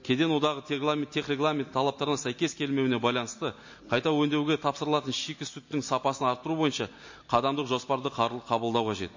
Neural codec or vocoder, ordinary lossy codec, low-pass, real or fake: none; MP3, 32 kbps; 7.2 kHz; real